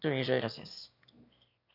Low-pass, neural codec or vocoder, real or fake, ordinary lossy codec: 5.4 kHz; autoencoder, 22.05 kHz, a latent of 192 numbers a frame, VITS, trained on one speaker; fake; none